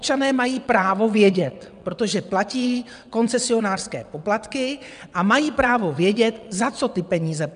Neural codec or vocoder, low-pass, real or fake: vocoder, 22.05 kHz, 80 mel bands, WaveNeXt; 9.9 kHz; fake